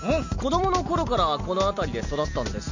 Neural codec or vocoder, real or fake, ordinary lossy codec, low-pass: none; real; none; 7.2 kHz